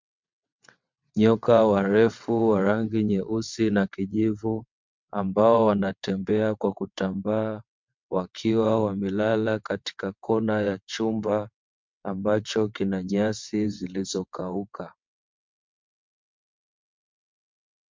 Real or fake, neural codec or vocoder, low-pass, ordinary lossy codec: fake; vocoder, 22.05 kHz, 80 mel bands, WaveNeXt; 7.2 kHz; MP3, 64 kbps